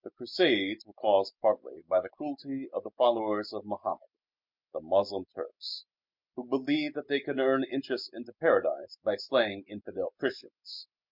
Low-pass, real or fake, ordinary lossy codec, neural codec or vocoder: 5.4 kHz; real; MP3, 48 kbps; none